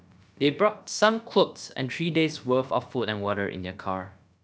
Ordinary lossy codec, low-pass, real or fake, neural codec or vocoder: none; none; fake; codec, 16 kHz, about 1 kbps, DyCAST, with the encoder's durations